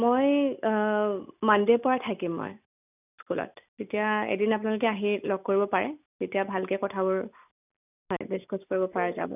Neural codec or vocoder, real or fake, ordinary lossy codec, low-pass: none; real; none; 3.6 kHz